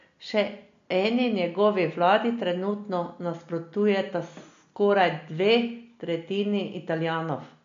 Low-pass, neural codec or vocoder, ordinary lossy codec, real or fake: 7.2 kHz; none; MP3, 48 kbps; real